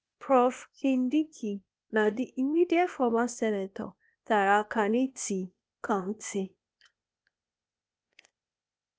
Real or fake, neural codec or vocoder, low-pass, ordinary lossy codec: fake; codec, 16 kHz, 0.8 kbps, ZipCodec; none; none